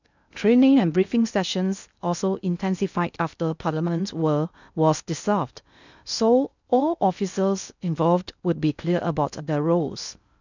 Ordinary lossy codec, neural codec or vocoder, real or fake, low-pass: none; codec, 16 kHz in and 24 kHz out, 0.6 kbps, FocalCodec, streaming, 2048 codes; fake; 7.2 kHz